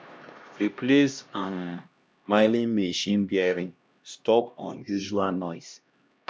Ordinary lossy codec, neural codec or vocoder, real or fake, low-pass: none; codec, 16 kHz, 1 kbps, X-Codec, HuBERT features, trained on LibriSpeech; fake; none